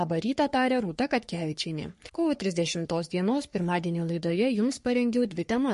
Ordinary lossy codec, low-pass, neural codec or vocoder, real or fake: MP3, 48 kbps; 14.4 kHz; codec, 44.1 kHz, 3.4 kbps, Pupu-Codec; fake